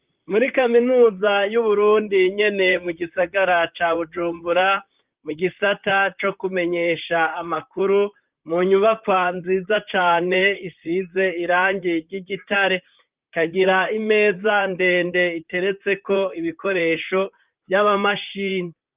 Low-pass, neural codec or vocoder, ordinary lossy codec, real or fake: 3.6 kHz; vocoder, 44.1 kHz, 128 mel bands, Pupu-Vocoder; Opus, 32 kbps; fake